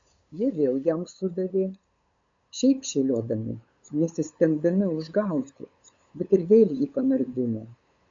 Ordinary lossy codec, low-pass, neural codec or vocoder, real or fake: AAC, 64 kbps; 7.2 kHz; codec, 16 kHz, 8 kbps, FunCodec, trained on LibriTTS, 25 frames a second; fake